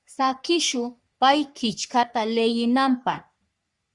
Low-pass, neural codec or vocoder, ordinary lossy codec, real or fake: 10.8 kHz; codec, 44.1 kHz, 3.4 kbps, Pupu-Codec; Opus, 64 kbps; fake